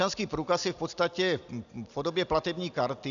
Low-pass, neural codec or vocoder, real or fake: 7.2 kHz; none; real